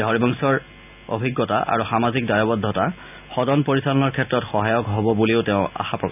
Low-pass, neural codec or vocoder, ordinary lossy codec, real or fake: 3.6 kHz; none; none; real